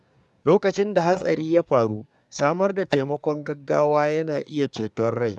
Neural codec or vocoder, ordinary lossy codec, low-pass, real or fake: codec, 24 kHz, 1 kbps, SNAC; none; none; fake